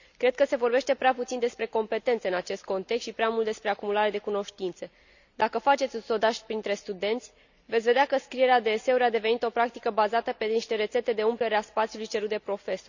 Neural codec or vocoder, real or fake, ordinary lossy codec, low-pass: none; real; none; 7.2 kHz